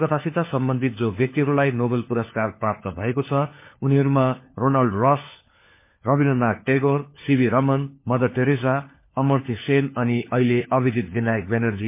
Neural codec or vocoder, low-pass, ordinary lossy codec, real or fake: codec, 16 kHz, 4 kbps, FunCodec, trained on LibriTTS, 50 frames a second; 3.6 kHz; MP3, 24 kbps; fake